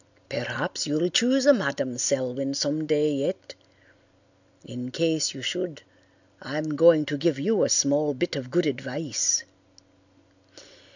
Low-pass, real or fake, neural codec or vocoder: 7.2 kHz; real; none